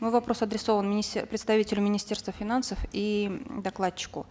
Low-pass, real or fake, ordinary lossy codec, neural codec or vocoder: none; real; none; none